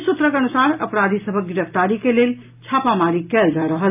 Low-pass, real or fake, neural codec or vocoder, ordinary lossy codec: 3.6 kHz; real; none; AAC, 32 kbps